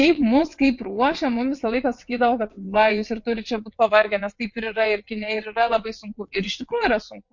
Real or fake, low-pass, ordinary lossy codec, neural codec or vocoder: fake; 7.2 kHz; MP3, 48 kbps; vocoder, 22.05 kHz, 80 mel bands, WaveNeXt